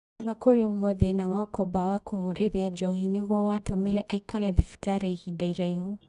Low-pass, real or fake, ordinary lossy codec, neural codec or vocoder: 10.8 kHz; fake; none; codec, 24 kHz, 0.9 kbps, WavTokenizer, medium music audio release